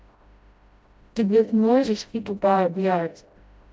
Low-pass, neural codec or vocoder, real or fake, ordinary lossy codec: none; codec, 16 kHz, 0.5 kbps, FreqCodec, smaller model; fake; none